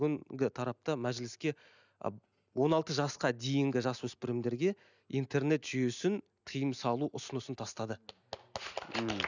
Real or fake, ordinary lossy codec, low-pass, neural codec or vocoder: real; none; 7.2 kHz; none